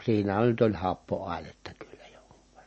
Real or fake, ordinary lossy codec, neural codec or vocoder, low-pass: real; MP3, 32 kbps; none; 9.9 kHz